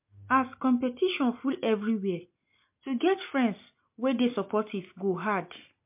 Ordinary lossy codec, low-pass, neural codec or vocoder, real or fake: MP3, 32 kbps; 3.6 kHz; none; real